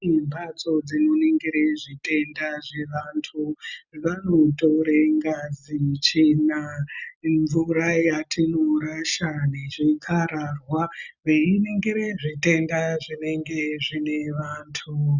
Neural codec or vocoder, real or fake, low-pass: none; real; 7.2 kHz